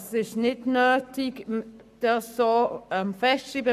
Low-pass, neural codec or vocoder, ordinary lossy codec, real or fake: 14.4 kHz; codec, 44.1 kHz, 7.8 kbps, Pupu-Codec; none; fake